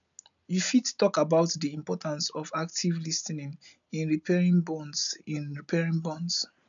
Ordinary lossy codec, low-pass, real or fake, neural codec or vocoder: none; 7.2 kHz; real; none